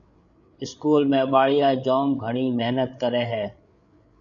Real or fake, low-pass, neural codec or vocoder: fake; 7.2 kHz; codec, 16 kHz, 8 kbps, FreqCodec, larger model